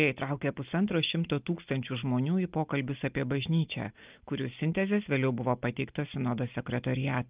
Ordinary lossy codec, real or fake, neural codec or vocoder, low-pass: Opus, 24 kbps; real; none; 3.6 kHz